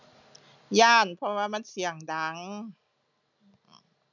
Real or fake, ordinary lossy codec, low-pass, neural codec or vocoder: real; none; 7.2 kHz; none